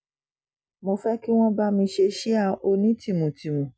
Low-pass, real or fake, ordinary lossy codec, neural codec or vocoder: none; real; none; none